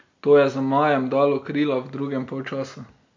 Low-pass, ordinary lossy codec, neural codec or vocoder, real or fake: 7.2 kHz; MP3, 48 kbps; none; real